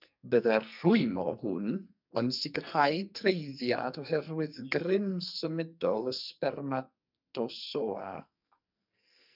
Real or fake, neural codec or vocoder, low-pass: fake; codec, 44.1 kHz, 2.6 kbps, SNAC; 5.4 kHz